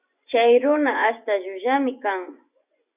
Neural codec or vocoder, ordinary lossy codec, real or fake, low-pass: vocoder, 24 kHz, 100 mel bands, Vocos; Opus, 64 kbps; fake; 3.6 kHz